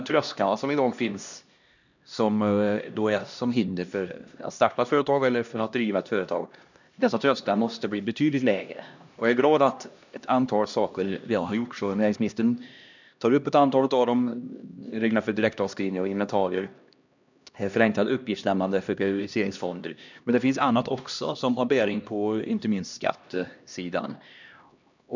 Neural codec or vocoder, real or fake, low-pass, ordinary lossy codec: codec, 16 kHz, 1 kbps, X-Codec, HuBERT features, trained on LibriSpeech; fake; 7.2 kHz; none